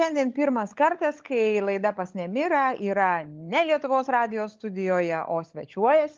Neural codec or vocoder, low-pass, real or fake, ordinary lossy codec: codec, 16 kHz, 16 kbps, FunCodec, trained on LibriTTS, 50 frames a second; 7.2 kHz; fake; Opus, 32 kbps